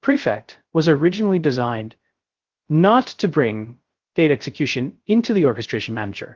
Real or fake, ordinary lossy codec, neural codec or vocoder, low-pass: fake; Opus, 16 kbps; codec, 16 kHz, 0.3 kbps, FocalCodec; 7.2 kHz